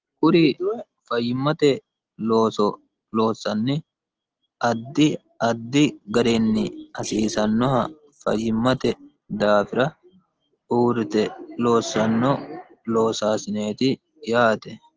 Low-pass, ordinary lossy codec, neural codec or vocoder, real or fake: 7.2 kHz; Opus, 16 kbps; none; real